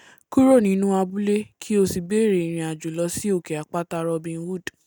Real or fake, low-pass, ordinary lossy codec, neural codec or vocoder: real; none; none; none